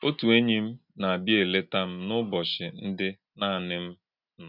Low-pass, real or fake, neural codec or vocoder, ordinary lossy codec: 5.4 kHz; real; none; none